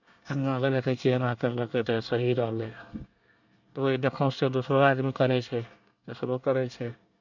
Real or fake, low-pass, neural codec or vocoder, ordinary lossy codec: fake; 7.2 kHz; codec, 24 kHz, 1 kbps, SNAC; none